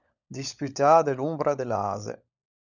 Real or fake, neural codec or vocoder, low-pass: fake; codec, 16 kHz, 16 kbps, FunCodec, trained on LibriTTS, 50 frames a second; 7.2 kHz